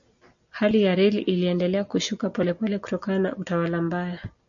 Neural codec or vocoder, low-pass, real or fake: none; 7.2 kHz; real